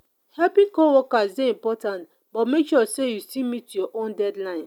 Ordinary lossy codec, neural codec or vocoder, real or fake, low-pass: none; none; real; 19.8 kHz